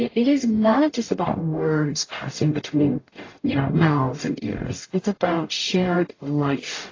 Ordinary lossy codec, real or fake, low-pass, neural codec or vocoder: AAC, 32 kbps; fake; 7.2 kHz; codec, 44.1 kHz, 0.9 kbps, DAC